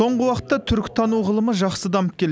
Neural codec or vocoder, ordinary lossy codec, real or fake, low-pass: none; none; real; none